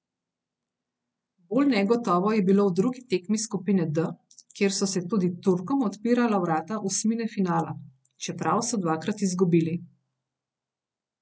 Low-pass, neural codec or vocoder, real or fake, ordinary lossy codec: none; none; real; none